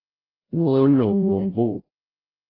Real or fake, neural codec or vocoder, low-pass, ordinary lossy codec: fake; codec, 16 kHz, 0.5 kbps, FreqCodec, larger model; 5.4 kHz; AAC, 24 kbps